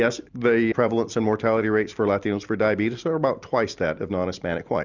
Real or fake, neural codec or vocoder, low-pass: real; none; 7.2 kHz